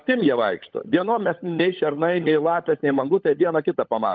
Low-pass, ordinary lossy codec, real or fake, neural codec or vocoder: 7.2 kHz; Opus, 32 kbps; fake; codec, 16 kHz, 16 kbps, FunCodec, trained on LibriTTS, 50 frames a second